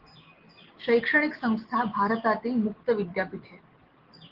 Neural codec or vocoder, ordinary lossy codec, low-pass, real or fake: none; Opus, 16 kbps; 5.4 kHz; real